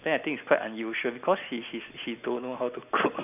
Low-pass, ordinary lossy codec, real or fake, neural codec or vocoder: 3.6 kHz; none; real; none